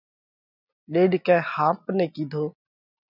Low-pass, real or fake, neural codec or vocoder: 5.4 kHz; real; none